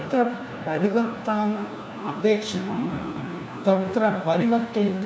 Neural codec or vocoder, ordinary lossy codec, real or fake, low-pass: codec, 16 kHz, 1 kbps, FunCodec, trained on LibriTTS, 50 frames a second; none; fake; none